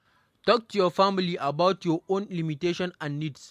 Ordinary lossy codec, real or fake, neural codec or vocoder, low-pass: MP3, 64 kbps; real; none; 14.4 kHz